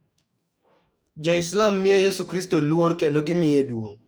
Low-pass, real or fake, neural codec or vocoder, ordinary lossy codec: none; fake; codec, 44.1 kHz, 2.6 kbps, DAC; none